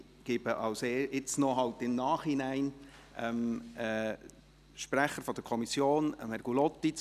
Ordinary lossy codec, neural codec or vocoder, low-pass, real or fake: none; none; 14.4 kHz; real